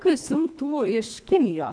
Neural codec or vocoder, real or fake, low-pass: codec, 24 kHz, 1.5 kbps, HILCodec; fake; 9.9 kHz